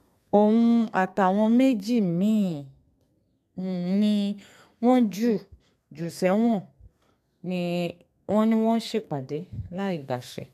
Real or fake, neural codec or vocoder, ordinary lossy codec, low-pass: fake; codec, 32 kHz, 1.9 kbps, SNAC; none; 14.4 kHz